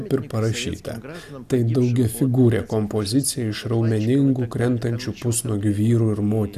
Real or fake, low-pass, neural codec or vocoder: real; 14.4 kHz; none